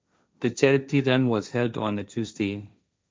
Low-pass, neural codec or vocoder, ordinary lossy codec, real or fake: none; codec, 16 kHz, 1.1 kbps, Voila-Tokenizer; none; fake